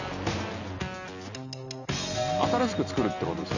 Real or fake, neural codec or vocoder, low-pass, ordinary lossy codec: real; none; 7.2 kHz; none